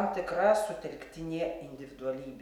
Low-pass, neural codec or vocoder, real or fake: 19.8 kHz; none; real